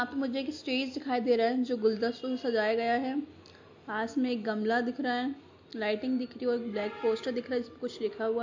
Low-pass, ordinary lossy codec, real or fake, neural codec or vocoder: 7.2 kHz; MP3, 48 kbps; real; none